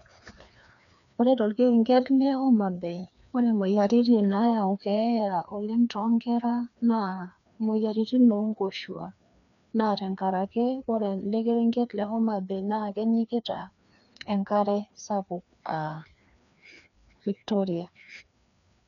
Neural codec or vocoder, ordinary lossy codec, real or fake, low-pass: codec, 16 kHz, 2 kbps, FreqCodec, larger model; none; fake; 7.2 kHz